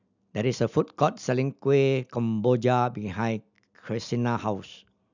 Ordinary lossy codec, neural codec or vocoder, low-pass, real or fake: none; none; 7.2 kHz; real